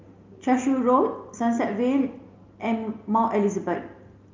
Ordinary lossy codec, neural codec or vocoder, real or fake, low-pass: Opus, 24 kbps; none; real; 7.2 kHz